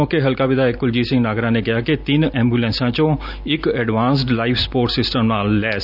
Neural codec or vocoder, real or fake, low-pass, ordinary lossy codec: none; real; 5.4 kHz; none